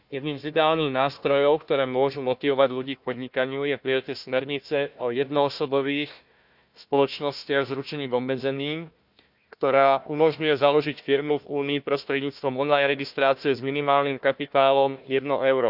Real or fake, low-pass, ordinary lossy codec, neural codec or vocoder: fake; 5.4 kHz; none; codec, 16 kHz, 1 kbps, FunCodec, trained on Chinese and English, 50 frames a second